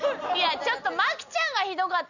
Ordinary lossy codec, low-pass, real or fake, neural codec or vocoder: none; 7.2 kHz; real; none